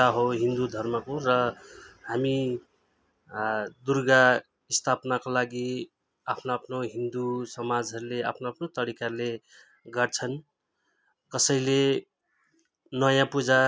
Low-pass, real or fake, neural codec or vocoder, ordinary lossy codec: none; real; none; none